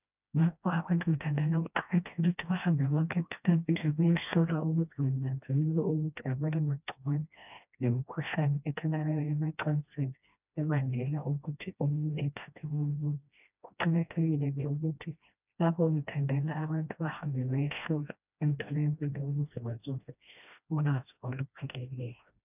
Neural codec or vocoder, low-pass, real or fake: codec, 16 kHz, 1 kbps, FreqCodec, smaller model; 3.6 kHz; fake